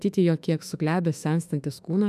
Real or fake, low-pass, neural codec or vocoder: fake; 14.4 kHz; autoencoder, 48 kHz, 32 numbers a frame, DAC-VAE, trained on Japanese speech